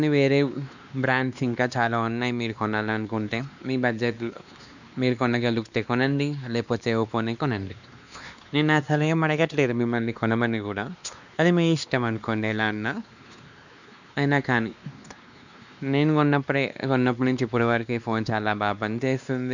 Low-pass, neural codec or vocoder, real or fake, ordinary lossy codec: 7.2 kHz; codec, 16 kHz, 2 kbps, X-Codec, WavLM features, trained on Multilingual LibriSpeech; fake; none